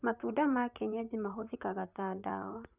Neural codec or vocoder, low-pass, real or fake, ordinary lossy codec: vocoder, 44.1 kHz, 128 mel bands, Pupu-Vocoder; 3.6 kHz; fake; none